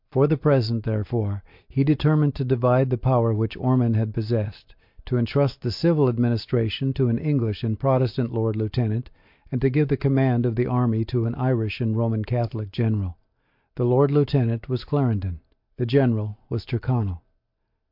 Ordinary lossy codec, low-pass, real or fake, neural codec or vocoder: MP3, 48 kbps; 5.4 kHz; real; none